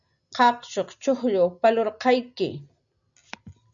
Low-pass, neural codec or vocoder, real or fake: 7.2 kHz; none; real